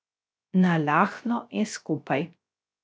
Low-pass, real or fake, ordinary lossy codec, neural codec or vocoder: none; fake; none; codec, 16 kHz, 0.3 kbps, FocalCodec